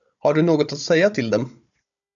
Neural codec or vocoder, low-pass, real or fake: codec, 16 kHz, 16 kbps, FunCodec, trained on Chinese and English, 50 frames a second; 7.2 kHz; fake